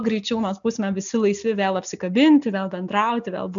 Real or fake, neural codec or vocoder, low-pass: real; none; 7.2 kHz